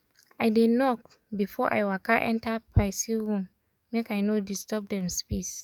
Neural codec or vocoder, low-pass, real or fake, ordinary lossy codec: codec, 44.1 kHz, 7.8 kbps, DAC; 19.8 kHz; fake; none